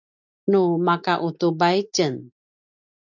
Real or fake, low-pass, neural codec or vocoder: real; 7.2 kHz; none